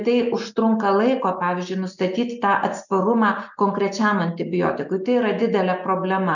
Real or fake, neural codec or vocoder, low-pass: real; none; 7.2 kHz